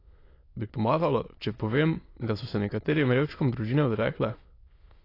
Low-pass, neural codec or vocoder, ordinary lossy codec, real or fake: 5.4 kHz; autoencoder, 22.05 kHz, a latent of 192 numbers a frame, VITS, trained on many speakers; AAC, 32 kbps; fake